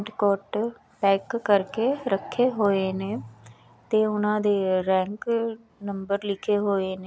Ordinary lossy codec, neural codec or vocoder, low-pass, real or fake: none; none; none; real